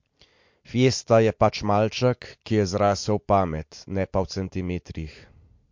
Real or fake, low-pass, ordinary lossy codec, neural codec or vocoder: real; 7.2 kHz; MP3, 48 kbps; none